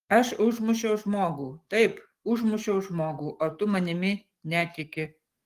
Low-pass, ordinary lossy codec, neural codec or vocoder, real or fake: 14.4 kHz; Opus, 24 kbps; codec, 44.1 kHz, 7.8 kbps, DAC; fake